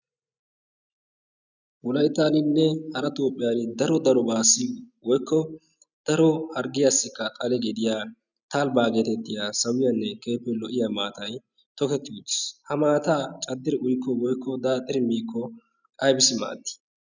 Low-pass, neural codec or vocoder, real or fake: 7.2 kHz; none; real